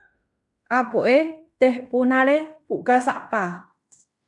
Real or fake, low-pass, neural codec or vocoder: fake; 10.8 kHz; codec, 16 kHz in and 24 kHz out, 0.9 kbps, LongCat-Audio-Codec, fine tuned four codebook decoder